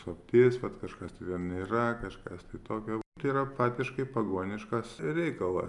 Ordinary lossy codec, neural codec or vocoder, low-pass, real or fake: MP3, 96 kbps; none; 10.8 kHz; real